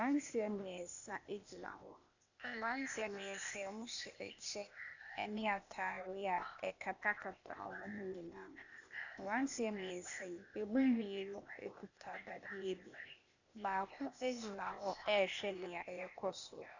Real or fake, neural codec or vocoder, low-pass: fake; codec, 16 kHz, 0.8 kbps, ZipCodec; 7.2 kHz